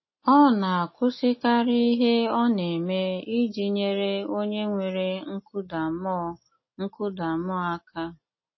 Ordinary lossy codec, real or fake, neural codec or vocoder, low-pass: MP3, 24 kbps; real; none; 7.2 kHz